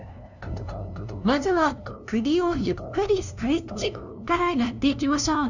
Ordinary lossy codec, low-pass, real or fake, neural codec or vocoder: none; 7.2 kHz; fake; codec, 16 kHz, 0.5 kbps, FunCodec, trained on LibriTTS, 25 frames a second